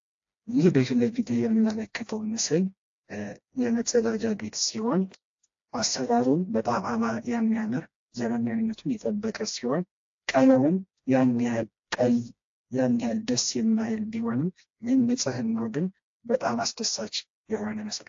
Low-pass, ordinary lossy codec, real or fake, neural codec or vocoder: 7.2 kHz; AAC, 48 kbps; fake; codec, 16 kHz, 1 kbps, FreqCodec, smaller model